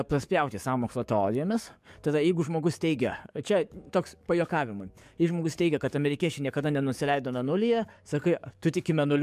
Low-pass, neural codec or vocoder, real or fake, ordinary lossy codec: 14.4 kHz; codec, 44.1 kHz, 7.8 kbps, DAC; fake; MP3, 96 kbps